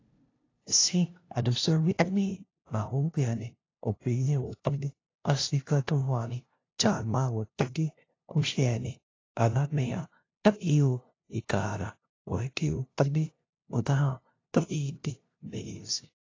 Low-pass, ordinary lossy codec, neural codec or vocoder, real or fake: 7.2 kHz; AAC, 32 kbps; codec, 16 kHz, 0.5 kbps, FunCodec, trained on LibriTTS, 25 frames a second; fake